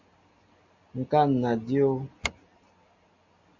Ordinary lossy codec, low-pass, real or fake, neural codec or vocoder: MP3, 64 kbps; 7.2 kHz; real; none